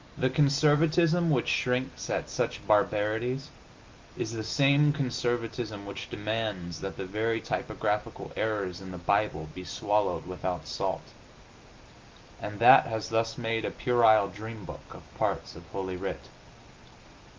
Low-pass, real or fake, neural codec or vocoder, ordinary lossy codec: 7.2 kHz; real; none; Opus, 32 kbps